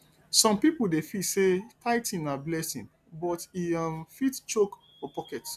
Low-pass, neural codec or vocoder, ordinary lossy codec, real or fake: 14.4 kHz; none; none; real